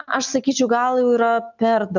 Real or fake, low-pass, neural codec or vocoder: real; 7.2 kHz; none